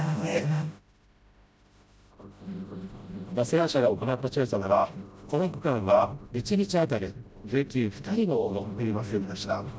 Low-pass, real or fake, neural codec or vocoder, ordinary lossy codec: none; fake; codec, 16 kHz, 0.5 kbps, FreqCodec, smaller model; none